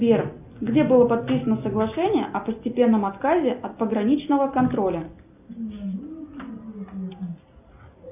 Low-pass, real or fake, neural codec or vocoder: 3.6 kHz; real; none